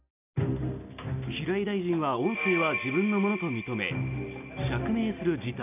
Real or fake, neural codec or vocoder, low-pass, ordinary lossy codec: real; none; 3.6 kHz; none